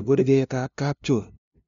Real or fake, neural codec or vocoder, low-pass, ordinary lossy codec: fake; codec, 16 kHz, 0.5 kbps, FunCodec, trained on LibriTTS, 25 frames a second; 7.2 kHz; none